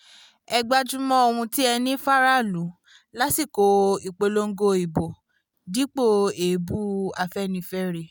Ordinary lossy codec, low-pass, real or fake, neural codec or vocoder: none; none; real; none